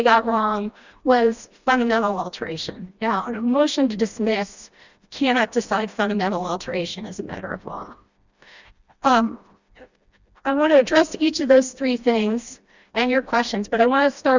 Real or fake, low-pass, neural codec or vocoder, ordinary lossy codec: fake; 7.2 kHz; codec, 16 kHz, 1 kbps, FreqCodec, smaller model; Opus, 64 kbps